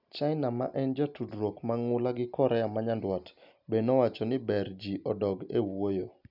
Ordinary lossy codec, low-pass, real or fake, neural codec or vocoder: none; 5.4 kHz; real; none